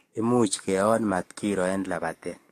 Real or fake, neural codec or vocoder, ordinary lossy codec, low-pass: fake; codec, 44.1 kHz, 7.8 kbps, DAC; AAC, 48 kbps; 14.4 kHz